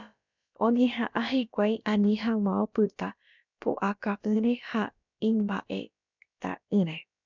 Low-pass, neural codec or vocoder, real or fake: 7.2 kHz; codec, 16 kHz, about 1 kbps, DyCAST, with the encoder's durations; fake